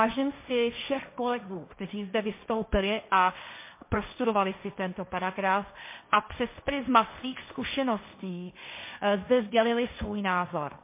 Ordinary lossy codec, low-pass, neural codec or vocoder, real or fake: MP3, 24 kbps; 3.6 kHz; codec, 16 kHz, 1.1 kbps, Voila-Tokenizer; fake